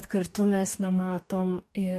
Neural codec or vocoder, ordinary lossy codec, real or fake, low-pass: codec, 44.1 kHz, 2.6 kbps, DAC; MP3, 64 kbps; fake; 14.4 kHz